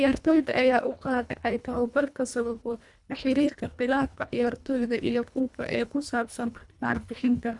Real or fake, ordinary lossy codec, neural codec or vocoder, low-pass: fake; none; codec, 24 kHz, 1.5 kbps, HILCodec; 10.8 kHz